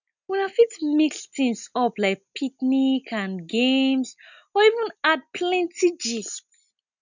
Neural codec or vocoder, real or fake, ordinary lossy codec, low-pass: none; real; none; 7.2 kHz